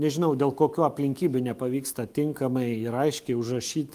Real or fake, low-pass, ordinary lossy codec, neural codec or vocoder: fake; 14.4 kHz; Opus, 32 kbps; autoencoder, 48 kHz, 128 numbers a frame, DAC-VAE, trained on Japanese speech